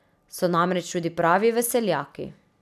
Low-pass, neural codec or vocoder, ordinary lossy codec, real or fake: 14.4 kHz; none; none; real